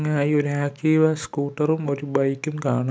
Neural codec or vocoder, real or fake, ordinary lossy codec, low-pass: none; real; none; none